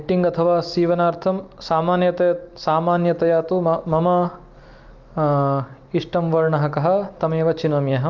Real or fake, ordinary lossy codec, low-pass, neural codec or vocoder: real; Opus, 24 kbps; 7.2 kHz; none